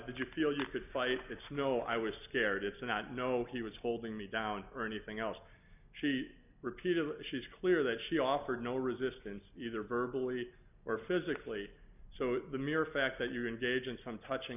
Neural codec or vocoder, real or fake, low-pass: none; real; 3.6 kHz